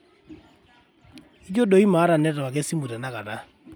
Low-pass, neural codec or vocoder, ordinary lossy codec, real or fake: none; none; none; real